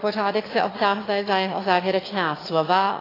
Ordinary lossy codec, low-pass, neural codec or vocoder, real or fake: AAC, 24 kbps; 5.4 kHz; autoencoder, 22.05 kHz, a latent of 192 numbers a frame, VITS, trained on one speaker; fake